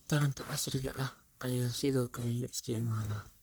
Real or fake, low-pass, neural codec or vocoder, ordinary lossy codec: fake; none; codec, 44.1 kHz, 1.7 kbps, Pupu-Codec; none